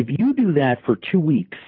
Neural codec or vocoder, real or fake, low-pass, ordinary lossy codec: vocoder, 44.1 kHz, 128 mel bands, Pupu-Vocoder; fake; 5.4 kHz; AAC, 32 kbps